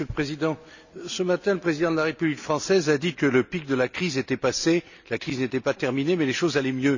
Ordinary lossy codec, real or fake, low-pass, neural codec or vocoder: none; real; 7.2 kHz; none